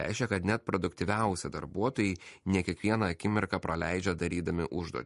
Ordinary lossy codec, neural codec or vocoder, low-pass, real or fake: MP3, 48 kbps; none; 14.4 kHz; real